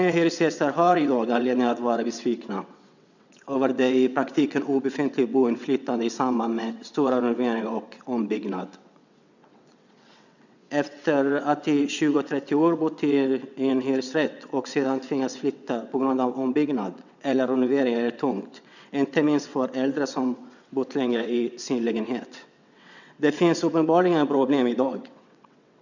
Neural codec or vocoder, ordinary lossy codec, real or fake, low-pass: vocoder, 22.05 kHz, 80 mel bands, WaveNeXt; none; fake; 7.2 kHz